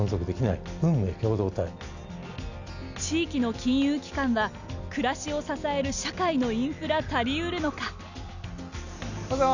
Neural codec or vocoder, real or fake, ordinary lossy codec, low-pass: none; real; none; 7.2 kHz